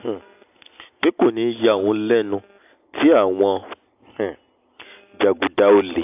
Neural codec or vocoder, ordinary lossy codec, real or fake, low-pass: none; AAC, 32 kbps; real; 3.6 kHz